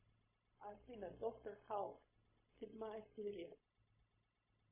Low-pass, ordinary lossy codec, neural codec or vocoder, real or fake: 3.6 kHz; MP3, 16 kbps; codec, 16 kHz, 0.4 kbps, LongCat-Audio-Codec; fake